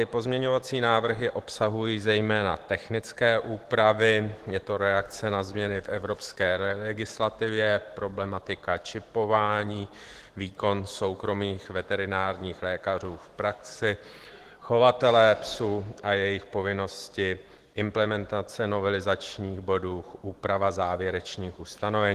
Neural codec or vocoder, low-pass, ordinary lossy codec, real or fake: autoencoder, 48 kHz, 128 numbers a frame, DAC-VAE, trained on Japanese speech; 14.4 kHz; Opus, 16 kbps; fake